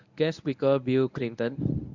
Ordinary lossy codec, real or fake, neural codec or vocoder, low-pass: none; fake; codec, 24 kHz, 0.9 kbps, WavTokenizer, medium speech release version 1; 7.2 kHz